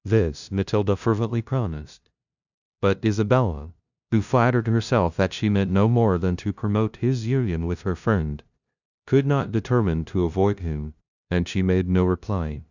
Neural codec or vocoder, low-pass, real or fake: codec, 16 kHz, 0.5 kbps, FunCodec, trained on LibriTTS, 25 frames a second; 7.2 kHz; fake